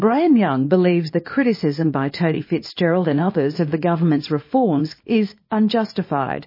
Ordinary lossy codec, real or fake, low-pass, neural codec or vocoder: MP3, 24 kbps; fake; 5.4 kHz; codec, 24 kHz, 0.9 kbps, WavTokenizer, small release